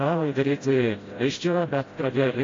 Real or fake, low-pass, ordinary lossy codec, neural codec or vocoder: fake; 7.2 kHz; AAC, 32 kbps; codec, 16 kHz, 0.5 kbps, FreqCodec, smaller model